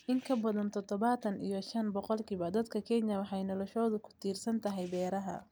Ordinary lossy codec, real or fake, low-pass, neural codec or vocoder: none; real; none; none